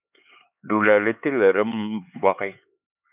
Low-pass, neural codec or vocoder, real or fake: 3.6 kHz; codec, 16 kHz, 4 kbps, X-Codec, HuBERT features, trained on LibriSpeech; fake